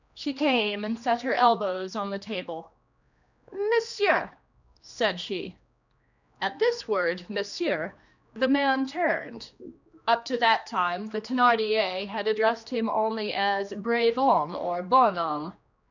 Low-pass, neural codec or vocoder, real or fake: 7.2 kHz; codec, 16 kHz, 2 kbps, X-Codec, HuBERT features, trained on general audio; fake